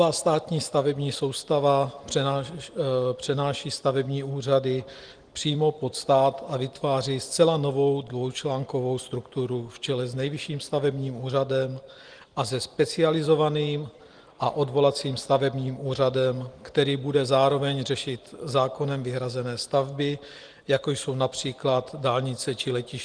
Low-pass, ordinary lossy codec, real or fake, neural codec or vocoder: 9.9 kHz; Opus, 24 kbps; real; none